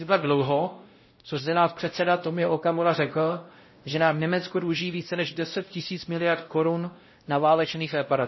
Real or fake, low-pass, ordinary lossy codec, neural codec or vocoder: fake; 7.2 kHz; MP3, 24 kbps; codec, 16 kHz, 0.5 kbps, X-Codec, WavLM features, trained on Multilingual LibriSpeech